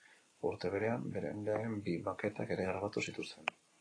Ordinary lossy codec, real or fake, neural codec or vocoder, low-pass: AAC, 32 kbps; real; none; 9.9 kHz